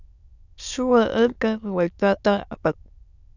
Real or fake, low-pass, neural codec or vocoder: fake; 7.2 kHz; autoencoder, 22.05 kHz, a latent of 192 numbers a frame, VITS, trained on many speakers